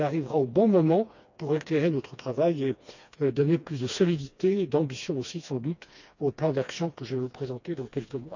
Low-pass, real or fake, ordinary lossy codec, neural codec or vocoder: 7.2 kHz; fake; none; codec, 16 kHz, 2 kbps, FreqCodec, smaller model